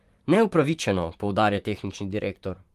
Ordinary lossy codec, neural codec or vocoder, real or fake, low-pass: Opus, 32 kbps; vocoder, 44.1 kHz, 128 mel bands, Pupu-Vocoder; fake; 14.4 kHz